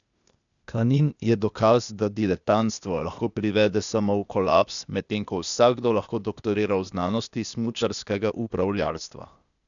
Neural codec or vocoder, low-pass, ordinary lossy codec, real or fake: codec, 16 kHz, 0.8 kbps, ZipCodec; 7.2 kHz; none; fake